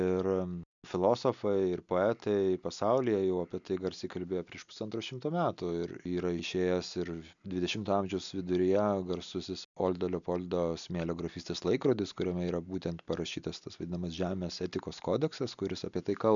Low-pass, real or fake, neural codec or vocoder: 7.2 kHz; real; none